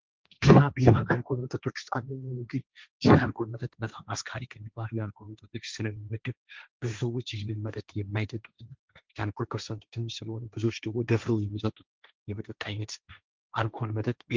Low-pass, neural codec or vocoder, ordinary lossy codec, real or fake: 7.2 kHz; codec, 16 kHz, 1.1 kbps, Voila-Tokenizer; Opus, 32 kbps; fake